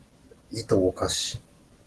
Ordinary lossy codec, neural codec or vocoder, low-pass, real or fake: Opus, 16 kbps; none; 10.8 kHz; real